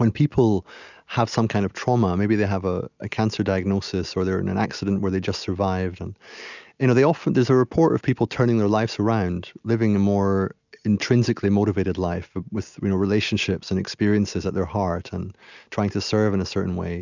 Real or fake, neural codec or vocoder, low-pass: real; none; 7.2 kHz